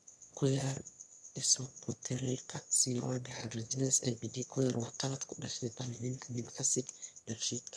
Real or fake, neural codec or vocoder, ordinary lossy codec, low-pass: fake; autoencoder, 22.05 kHz, a latent of 192 numbers a frame, VITS, trained on one speaker; none; none